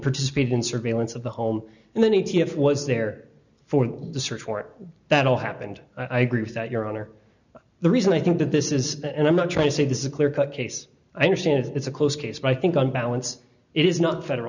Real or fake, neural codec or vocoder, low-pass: real; none; 7.2 kHz